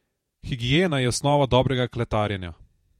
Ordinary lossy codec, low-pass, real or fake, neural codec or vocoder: MP3, 64 kbps; 19.8 kHz; fake; vocoder, 48 kHz, 128 mel bands, Vocos